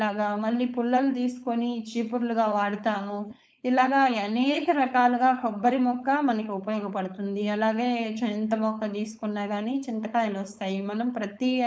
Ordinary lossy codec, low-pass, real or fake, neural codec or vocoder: none; none; fake; codec, 16 kHz, 4.8 kbps, FACodec